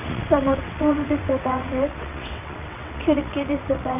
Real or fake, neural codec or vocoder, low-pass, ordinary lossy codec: fake; vocoder, 22.05 kHz, 80 mel bands, WaveNeXt; 3.6 kHz; none